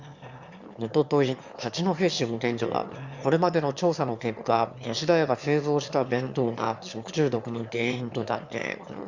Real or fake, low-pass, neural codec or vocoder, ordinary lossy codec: fake; 7.2 kHz; autoencoder, 22.05 kHz, a latent of 192 numbers a frame, VITS, trained on one speaker; Opus, 64 kbps